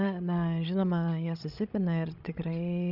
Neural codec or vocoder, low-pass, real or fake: codec, 16 kHz, 16 kbps, FreqCodec, larger model; 5.4 kHz; fake